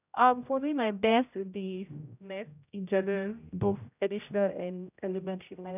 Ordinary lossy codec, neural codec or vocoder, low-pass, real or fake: none; codec, 16 kHz, 0.5 kbps, X-Codec, HuBERT features, trained on general audio; 3.6 kHz; fake